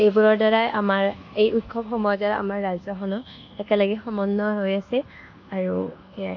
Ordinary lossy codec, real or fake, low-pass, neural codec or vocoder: AAC, 48 kbps; fake; 7.2 kHz; codec, 24 kHz, 1.2 kbps, DualCodec